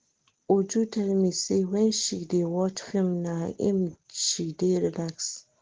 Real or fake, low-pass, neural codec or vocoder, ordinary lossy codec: real; 7.2 kHz; none; Opus, 16 kbps